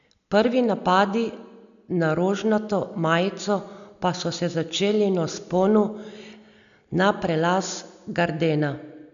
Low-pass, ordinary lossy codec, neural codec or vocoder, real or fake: 7.2 kHz; none; none; real